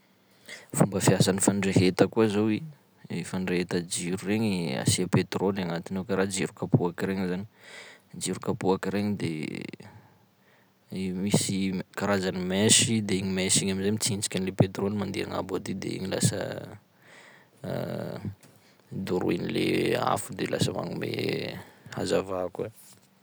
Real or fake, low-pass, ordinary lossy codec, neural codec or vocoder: real; none; none; none